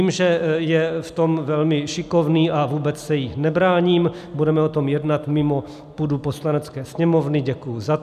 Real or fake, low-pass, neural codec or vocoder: real; 14.4 kHz; none